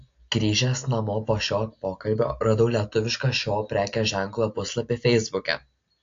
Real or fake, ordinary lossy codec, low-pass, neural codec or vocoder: real; AAC, 48 kbps; 7.2 kHz; none